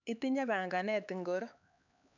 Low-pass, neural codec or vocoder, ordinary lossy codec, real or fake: 7.2 kHz; codec, 16 kHz, 4 kbps, X-Codec, HuBERT features, trained on LibriSpeech; none; fake